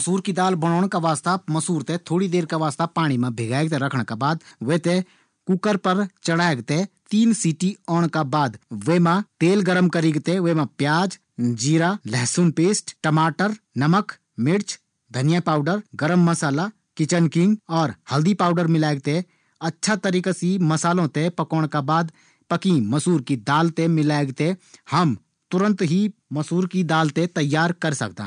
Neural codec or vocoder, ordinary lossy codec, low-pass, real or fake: none; none; 9.9 kHz; real